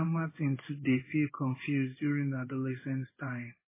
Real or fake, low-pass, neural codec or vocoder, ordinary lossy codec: fake; 3.6 kHz; codec, 16 kHz in and 24 kHz out, 1 kbps, XY-Tokenizer; MP3, 16 kbps